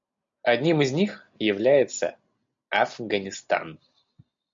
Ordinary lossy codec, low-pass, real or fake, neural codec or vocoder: MP3, 48 kbps; 7.2 kHz; real; none